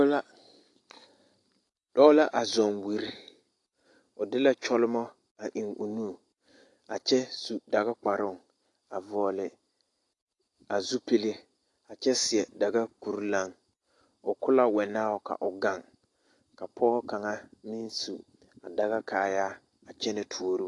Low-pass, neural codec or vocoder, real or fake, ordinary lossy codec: 10.8 kHz; none; real; AAC, 48 kbps